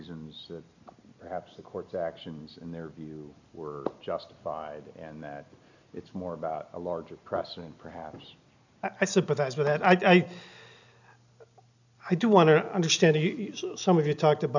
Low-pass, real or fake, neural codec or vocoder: 7.2 kHz; real; none